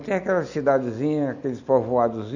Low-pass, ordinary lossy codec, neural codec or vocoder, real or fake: 7.2 kHz; none; none; real